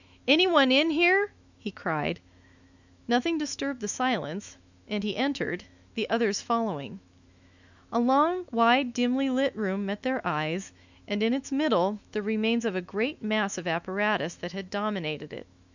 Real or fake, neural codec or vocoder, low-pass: fake; autoencoder, 48 kHz, 128 numbers a frame, DAC-VAE, trained on Japanese speech; 7.2 kHz